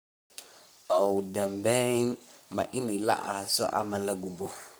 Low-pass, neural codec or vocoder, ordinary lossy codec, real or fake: none; codec, 44.1 kHz, 3.4 kbps, Pupu-Codec; none; fake